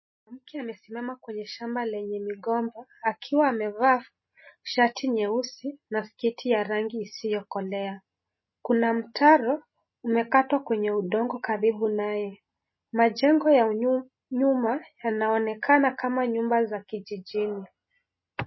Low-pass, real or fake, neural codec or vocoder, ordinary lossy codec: 7.2 kHz; real; none; MP3, 24 kbps